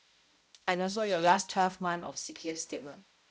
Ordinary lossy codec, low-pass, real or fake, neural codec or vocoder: none; none; fake; codec, 16 kHz, 0.5 kbps, X-Codec, HuBERT features, trained on balanced general audio